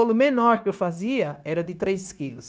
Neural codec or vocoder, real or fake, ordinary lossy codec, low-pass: codec, 16 kHz, 0.9 kbps, LongCat-Audio-Codec; fake; none; none